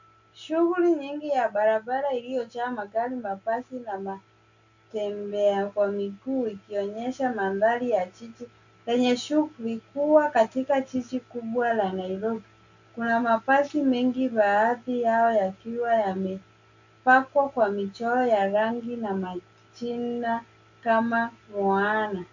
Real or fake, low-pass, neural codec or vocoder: real; 7.2 kHz; none